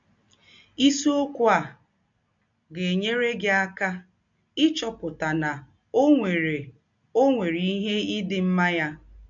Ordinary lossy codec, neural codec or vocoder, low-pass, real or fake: MP3, 48 kbps; none; 7.2 kHz; real